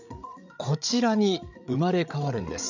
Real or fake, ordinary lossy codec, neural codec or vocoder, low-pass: fake; none; codec, 16 kHz, 8 kbps, FreqCodec, larger model; 7.2 kHz